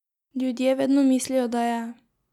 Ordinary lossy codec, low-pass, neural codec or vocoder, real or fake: none; 19.8 kHz; none; real